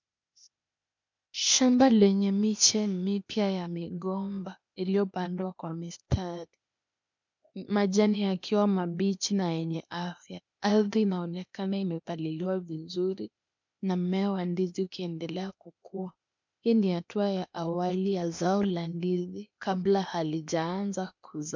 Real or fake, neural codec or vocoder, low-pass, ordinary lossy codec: fake; codec, 16 kHz, 0.8 kbps, ZipCodec; 7.2 kHz; MP3, 64 kbps